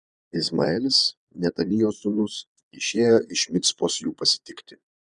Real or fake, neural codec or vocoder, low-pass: fake; vocoder, 22.05 kHz, 80 mel bands, Vocos; 9.9 kHz